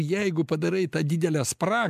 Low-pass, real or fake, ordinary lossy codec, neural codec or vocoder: 14.4 kHz; real; MP3, 96 kbps; none